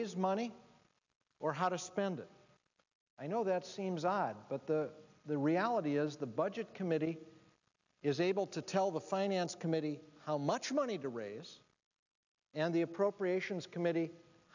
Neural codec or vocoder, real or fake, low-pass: none; real; 7.2 kHz